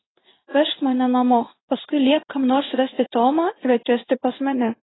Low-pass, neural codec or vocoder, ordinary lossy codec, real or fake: 7.2 kHz; codec, 16 kHz in and 24 kHz out, 1 kbps, XY-Tokenizer; AAC, 16 kbps; fake